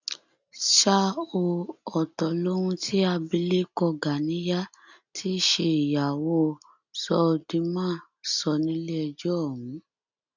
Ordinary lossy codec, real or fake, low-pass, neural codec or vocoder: none; real; 7.2 kHz; none